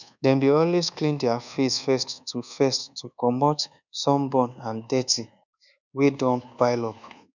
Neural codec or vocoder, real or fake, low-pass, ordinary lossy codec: codec, 24 kHz, 1.2 kbps, DualCodec; fake; 7.2 kHz; none